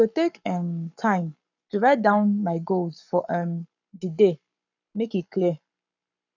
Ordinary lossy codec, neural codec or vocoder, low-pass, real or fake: none; codec, 44.1 kHz, 7.8 kbps, Pupu-Codec; 7.2 kHz; fake